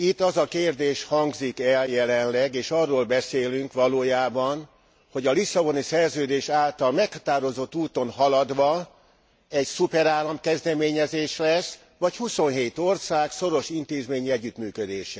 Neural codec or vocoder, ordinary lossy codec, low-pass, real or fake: none; none; none; real